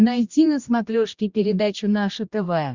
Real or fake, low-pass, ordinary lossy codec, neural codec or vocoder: fake; 7.2 kHz; Opus, 64 kbps; codec, 16 kHz, 1 kbps, X-Codec, HuBERT features, trained on general audio